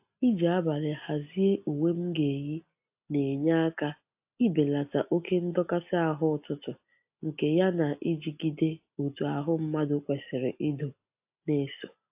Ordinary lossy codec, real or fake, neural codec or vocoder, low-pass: AAC, 32 kbps; real; none; 3.6 kHz